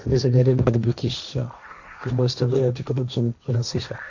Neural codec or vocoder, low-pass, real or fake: codec, 16 kHz, 1.1 kbps, Voila-Tokenizer; 7.2 kHz; fake